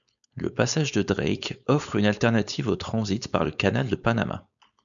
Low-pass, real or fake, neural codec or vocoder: 7.2 kHz; fake; codec, 16 kHz, 4.8 kbps, FACodec